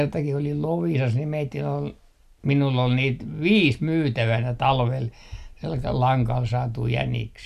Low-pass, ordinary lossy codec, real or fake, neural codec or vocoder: 14.4 kHz; none; real; none